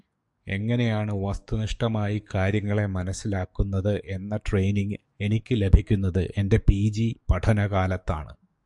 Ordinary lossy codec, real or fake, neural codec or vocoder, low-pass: Opus, 64 kbps; fake; codec, 44.1 kHz, 7.8 kbps, DAC; 10.8 kHz